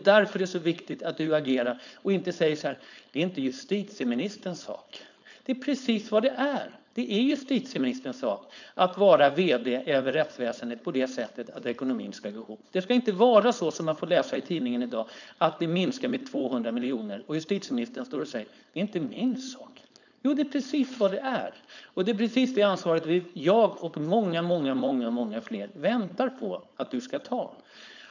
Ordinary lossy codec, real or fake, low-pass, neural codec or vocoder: none; fake; 7.2 kHz; codec, 16 kHz, 4.8 kbps, FACodec